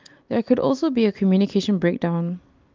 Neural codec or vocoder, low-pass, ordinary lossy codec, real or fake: none; 7.2 kHz; Opus, 32 kbps; real